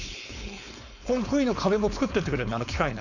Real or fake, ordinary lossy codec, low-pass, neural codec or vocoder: fake; none; 7.2 kHz; codec, 16 kHz, 4.8 kbps, FACodec